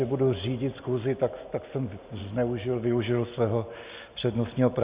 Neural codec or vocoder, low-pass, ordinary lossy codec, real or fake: none; 3.6 kHz; Opus, 64 kbps; real